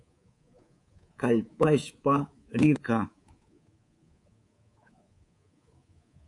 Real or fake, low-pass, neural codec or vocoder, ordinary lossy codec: fake; 10.8 kHz; codec, 24 kHz, 3.1 kbps, DualCodec; AAC, 48 kbps